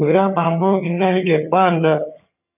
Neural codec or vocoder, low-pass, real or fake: vocoder, 22.05 kHz, 80 mel bands, HiFi-GAN; 3.6 kHz; fake